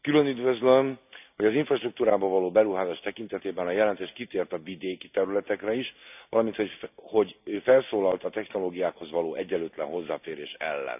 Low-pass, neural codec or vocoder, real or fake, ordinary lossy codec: 3.6 kHz; none; real; none